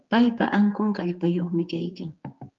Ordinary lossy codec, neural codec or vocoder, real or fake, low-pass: Opus, 32 kbps; codec, 16 kHz, 4 kbps, X-Codec, HuBERT features, trained on general audio; fake; 7.2 kHz